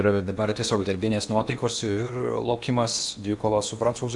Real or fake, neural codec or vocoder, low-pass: fake; codec, 16 kHz in and 24 kHz out, 0.8 kbps, FocalCodec, streaming, 65536 codes; 10.8 kHz